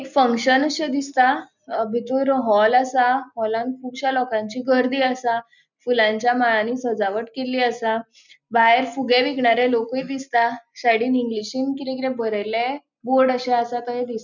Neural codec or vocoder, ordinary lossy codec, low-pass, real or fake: none; none; 7.2 kHz; real